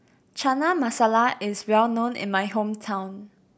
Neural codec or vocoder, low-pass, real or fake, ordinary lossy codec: none; none; real; none